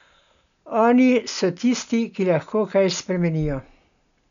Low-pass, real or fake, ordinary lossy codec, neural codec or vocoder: 7.2 kHz; real; none; none